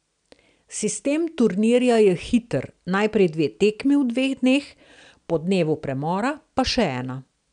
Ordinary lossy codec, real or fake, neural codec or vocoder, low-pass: none; real; none; 9.9 kHz